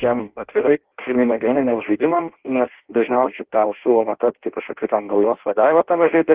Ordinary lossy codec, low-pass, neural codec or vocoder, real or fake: Opus, 16 kbps; 3.6 kHz; codec, 16 kHz in and 24 kHz out, 0.6 kbps, FireRedTTS-2 codec; fake